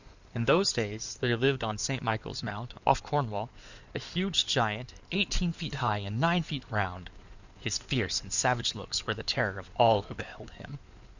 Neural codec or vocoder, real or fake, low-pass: codec, 16 kHz in and 24 kHz out, 2.2 kbps, FireRedTTS-2 codec; fake; 7.2 kHz